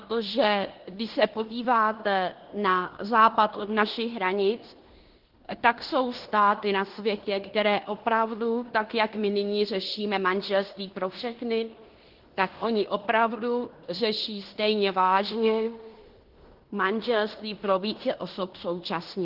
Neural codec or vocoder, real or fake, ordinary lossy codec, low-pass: codec, 16 kHz in and 24 kHz out, 0.9 kbps, LongCat-Audio-Codec, fine tuned four codebook decoder; fake; Opus, 16 kbps; 5.4 kHz